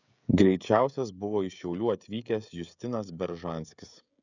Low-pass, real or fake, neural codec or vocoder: 7.2 kHz; fake; codec, 16 kHz, 16 kbps, FreqCodec, smaller model